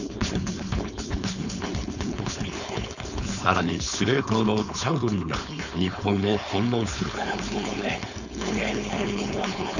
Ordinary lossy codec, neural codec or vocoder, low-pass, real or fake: none; codec, 16 kHz, 4.8 kbps, FACodec; 7.2 kHz; fake